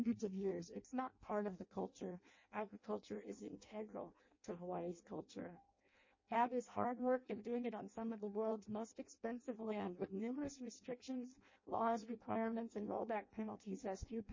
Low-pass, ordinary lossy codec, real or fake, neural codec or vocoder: 7.2 kHz; MP3, 32 kbps; fake; codec, 16 kHz in and 24 kHz out, 0.6 kbps, FireRedTTS-2 codec